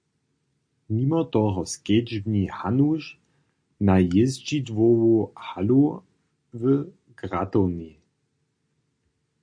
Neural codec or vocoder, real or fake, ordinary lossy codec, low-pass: none; real; MP3, 48 kbps; 9.9 kHz